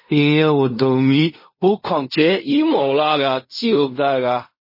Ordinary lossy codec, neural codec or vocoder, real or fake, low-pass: MP3, 24 kbps; codec, 16 kHz in and 24 kHz out, 0.4 kbps, LongCat-Audio-Codec, fine tuned four codebook decoder; fake; 5.4 kHz